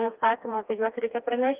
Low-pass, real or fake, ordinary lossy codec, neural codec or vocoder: 3.6 kHz; fake; Opus, 32 kbps; codec, 16 kHz, 2 kbps, FreqCodec, smaller model